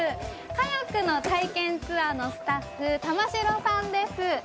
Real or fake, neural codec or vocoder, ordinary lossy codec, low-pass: real; none; none; none